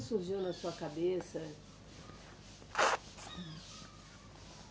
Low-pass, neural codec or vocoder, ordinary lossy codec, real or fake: none; none; none; real